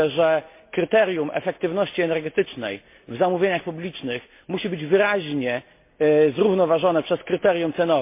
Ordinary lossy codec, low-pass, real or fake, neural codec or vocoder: MP3, 24 kbps; 3.6 kHz; real; none